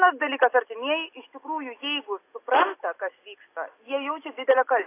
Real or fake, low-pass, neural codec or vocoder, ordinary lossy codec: real; 3.6 kHz; none; AAC, 24 kbps